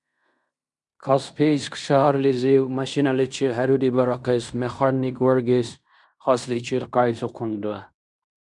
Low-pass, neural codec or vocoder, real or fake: 10.8 kHz; codec, 16 kHz in and 24 kHz out, 0.9 kbps, LongCat-Audio-Codec, fine tuned four codebook decoder; fake